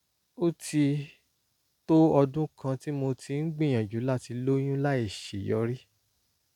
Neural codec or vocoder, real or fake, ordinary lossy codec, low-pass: none; real; none; 19.8 kHz